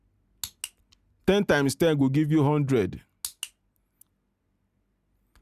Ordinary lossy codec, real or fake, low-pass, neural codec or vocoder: Opus, 64 kbps; real; 14.4 kHz; none